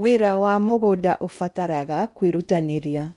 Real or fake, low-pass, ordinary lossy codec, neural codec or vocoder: fake; 10.8 kHz; none; codec, 16 kHz in and 24 kHz out, 0.8 kbps, FocalCodec, streaming, 65536 codes